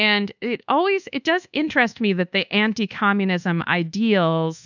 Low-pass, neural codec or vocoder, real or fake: 7.2 kHz; codec, 24 kHz, 1.2 kbps, DualCodec; fake